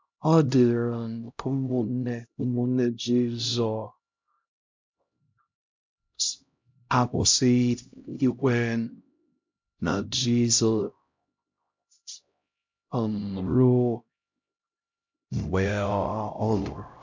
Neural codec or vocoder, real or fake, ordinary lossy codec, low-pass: codec, 16 kHz, 0.5 kbps, X-Codec, HuBERT features, trained on LibriSpeech; fake; MP3, 64 kbps; 7.2 kHz